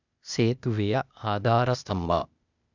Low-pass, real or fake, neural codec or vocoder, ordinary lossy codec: 7.2 kHz; fake; codec, 16 kHz, 0.8 kbps, ZipCodec; none